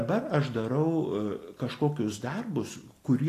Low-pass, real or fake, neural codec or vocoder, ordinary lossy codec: 14.4 kHz; real; none; AAC, 48 kbps